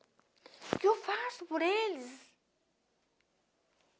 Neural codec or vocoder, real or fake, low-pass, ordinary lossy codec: none; real; none; none